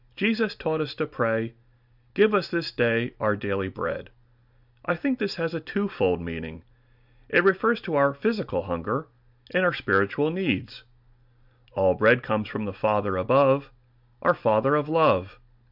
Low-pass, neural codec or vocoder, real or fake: 5.4 kHz; none; real